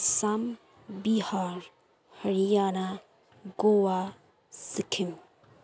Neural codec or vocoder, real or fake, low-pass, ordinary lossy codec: none; real; none; none